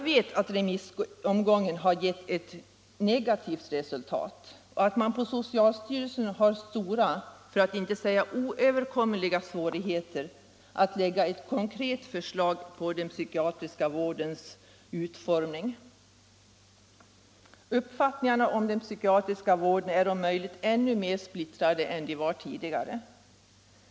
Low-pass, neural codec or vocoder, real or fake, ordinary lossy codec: none; none; real; none